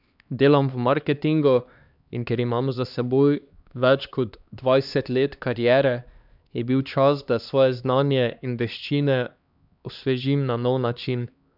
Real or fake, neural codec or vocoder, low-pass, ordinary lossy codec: fake; codec, 16 kHz, 2 kbps, X-Codec, HuBERT features, trained on LibriSpeech; 5.4 kHz; none